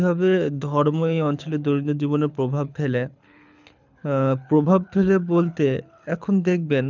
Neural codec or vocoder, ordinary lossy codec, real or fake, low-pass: codec, 24 kHz, 6 kbps, HILCodec; none; fake; 7.2 kHz